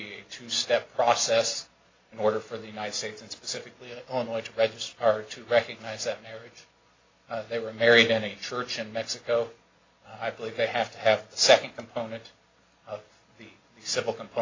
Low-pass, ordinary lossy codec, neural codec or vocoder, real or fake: 7.2 kHz; AAC, 32 kbps; none; real